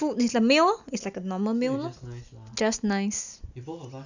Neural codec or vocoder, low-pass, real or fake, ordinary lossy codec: none; 7.2 kHz; real; none